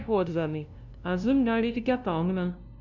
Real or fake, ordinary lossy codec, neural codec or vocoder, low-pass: fake; none; codec, 16 kHz, 0.5 kbps, FunCodec, trained on LibriTTS, 25 frames a second; 7.2 kHz